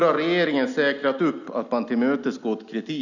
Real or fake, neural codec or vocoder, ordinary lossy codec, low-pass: real; none; none; 7.2 kHz